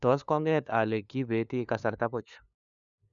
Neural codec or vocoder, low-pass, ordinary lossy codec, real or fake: codec, 16 kHz, 2 kbps, FunCodec, trained on LibriTTS, 25 frames a second; 7.2 kHz; none; fake